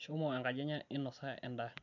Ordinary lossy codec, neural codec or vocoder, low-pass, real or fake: none; none; 7.2 kHz; real